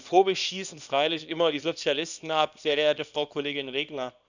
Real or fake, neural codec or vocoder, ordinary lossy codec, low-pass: fake; codec, 24 kHz, 0.9 kbps, WavTokenizer, small release; none; 7.2 kHz